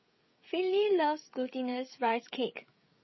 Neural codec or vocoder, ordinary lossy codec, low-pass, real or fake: codec, 16 kHz, 16 kbps, FreqCodec, smaller model; MP3, 24 kbps; 7.2 kHz; fake